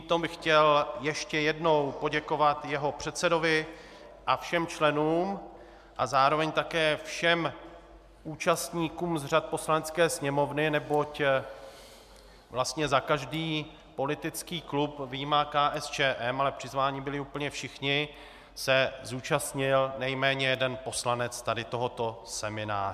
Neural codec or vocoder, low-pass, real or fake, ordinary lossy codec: none; 14.4 kHz; real; MP3, 96 kbps